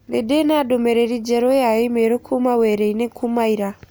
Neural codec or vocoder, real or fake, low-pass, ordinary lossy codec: none; real; none; none